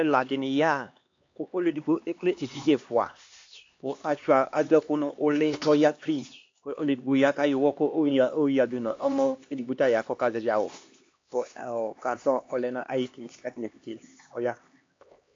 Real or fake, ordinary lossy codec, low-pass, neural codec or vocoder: fake; AAC, 48 kbps; 7.2 kHz; codec, 16 kHz, 2 kbps, X-Codec, HuBERT features, trained on LibriSpeech